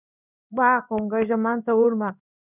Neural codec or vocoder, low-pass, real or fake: codec, 16 kHz in and 24 kHz out, 1 kbps, XY-Tokenizer; 3.6 kHz; fake